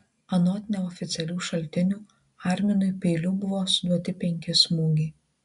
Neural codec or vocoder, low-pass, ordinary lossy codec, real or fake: none; 10.8 kHz; MP3, 96 kbps; real